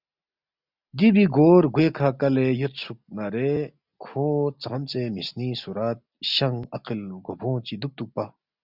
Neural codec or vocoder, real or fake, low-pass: none; real; 5.4 kHz